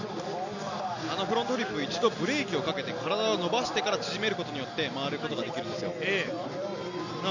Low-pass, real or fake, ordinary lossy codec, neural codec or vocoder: 7.2 kHz; real; none; none